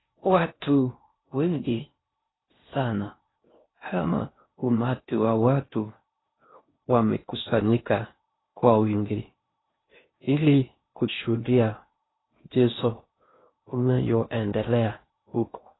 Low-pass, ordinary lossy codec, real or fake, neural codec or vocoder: 7.2 kHz; AAC, 16 kbps; fake; codec, 16 kHz in and 24 kHz out, 0.6 kbps, FocalCodec, streaming, 4096 codes